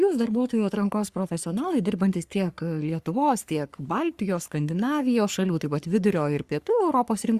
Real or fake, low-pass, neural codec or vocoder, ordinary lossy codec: fake; 14.4 kHz; codec, 44.1 kHz, 3.4 kbps, Pupu-Codec; Opus, 64 kbps